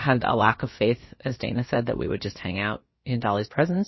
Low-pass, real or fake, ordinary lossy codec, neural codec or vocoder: 7.2 kHz; fake; MP3, 24 kbps; codec, 16 kHz, about 1 kbps, DyCAST, with the encoder's durations